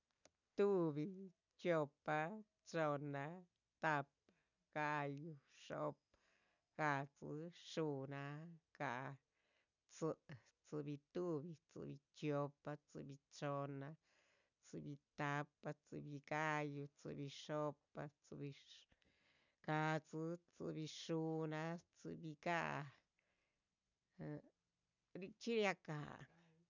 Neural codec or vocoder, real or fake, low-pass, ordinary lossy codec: none; real; 7.2 kHz; none